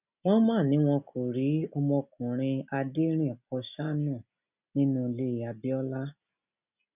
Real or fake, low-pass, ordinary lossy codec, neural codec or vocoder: real; 3.6 kHz; none; none